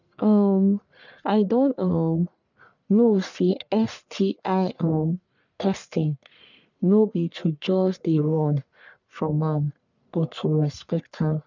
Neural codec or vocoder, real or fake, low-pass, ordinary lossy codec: codec, 44.1 kHz, 1.7 kbps, Pupu-Codec; fake; 7.2 kHz; none